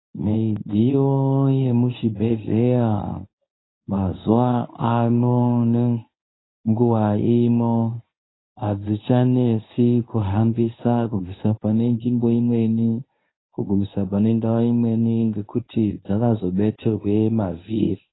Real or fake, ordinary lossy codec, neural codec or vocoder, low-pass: fake; AAC, 16 kbps; codec, 24 kHz, 0.9 kbps, WavTokenizer, medium speech release version 1; 7.2 kHz